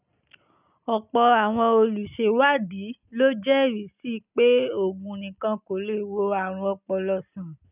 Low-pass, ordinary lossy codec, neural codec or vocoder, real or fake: 3.6 kHz; none; none; real